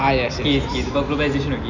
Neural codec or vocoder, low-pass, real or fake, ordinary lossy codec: none; 7.2 kHz; real; none